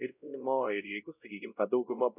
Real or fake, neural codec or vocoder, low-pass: fake; codec, 16 kHz, 0.5 kbps, X-Codec, WavLM features, trained on Multilingual LibriSpeech; 3.6 kHz